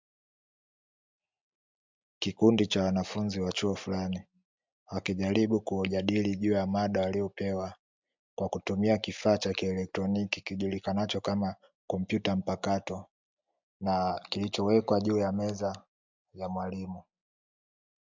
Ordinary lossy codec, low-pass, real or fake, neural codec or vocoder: MP3, 64 kbps; 7.2 kHz; real; none